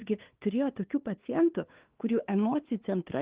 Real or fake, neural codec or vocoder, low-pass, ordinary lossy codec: fake; codec, 16 kHz, 2 kbps, FunCodec, trained on LibriTTS, 25 frames a second; 3.6 kHz; Opus, 16 kbps